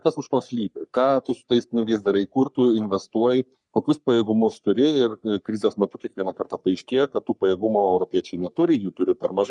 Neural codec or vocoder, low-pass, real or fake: codec, 44.1 kHz, 3.4 kbps, Pupu-Codec; 10.8 kHz; fake